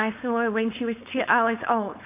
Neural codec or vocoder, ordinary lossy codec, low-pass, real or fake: codec, 16 kHz, 4.8 kbps, FACodec; AAC, 32 kbps; 3.6 kHz; fake